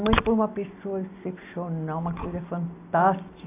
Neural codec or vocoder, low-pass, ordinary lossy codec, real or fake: none; 3.6 kHz; AAC, 24 kbps; real